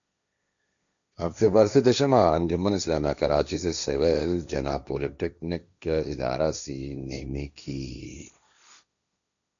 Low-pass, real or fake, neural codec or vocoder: 7.2 kHz; fake; codec, 16 kHz, 1.1 kbps, Voila-Tokenizer